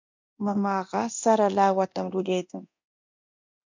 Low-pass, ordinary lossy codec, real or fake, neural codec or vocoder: 7.2 kHz; MP3, 64 kbps; fake; codec, 24 kHz, 0.9 kbps, DualCodec